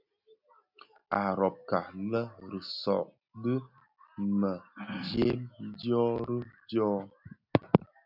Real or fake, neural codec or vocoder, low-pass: real; none; 5.4 kHz